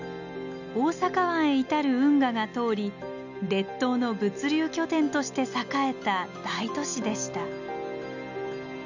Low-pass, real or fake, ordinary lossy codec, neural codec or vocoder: 7.2 kHz; real; none; none